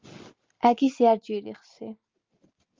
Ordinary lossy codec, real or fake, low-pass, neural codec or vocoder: Opus, 32 kbps; real; 7.2 kHz; none